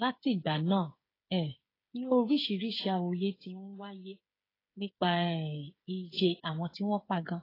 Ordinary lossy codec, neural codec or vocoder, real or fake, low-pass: AAC, 24 kbps; codec, 16 kHz, 8 kbps, FreqCodec, smaller model; fake; 5.4 kHz